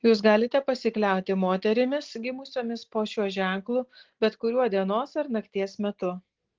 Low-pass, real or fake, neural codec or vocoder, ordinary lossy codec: 7.2 kHz; real; none; Opus, 16 kbps